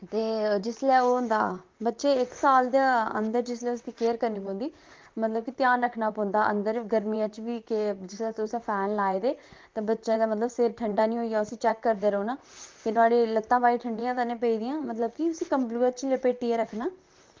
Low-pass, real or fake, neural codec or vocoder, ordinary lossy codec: 7.2 kHz; fake; vocoder, 44.1 kHz, 128 mel bands, Pupu-Vocoder; Opus, 32 kbps